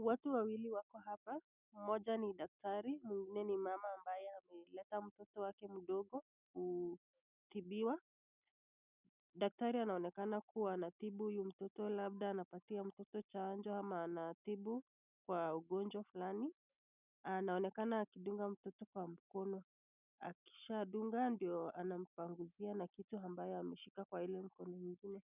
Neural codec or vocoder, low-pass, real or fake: none; 3.6 kHz; real